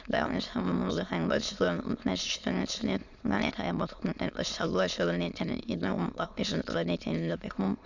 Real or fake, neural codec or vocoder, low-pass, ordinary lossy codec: fake; autoencoder, 22.05 kHz, a latent of 192 numbers a frame, VITS, trained on many speakers; 7.2 kHz; none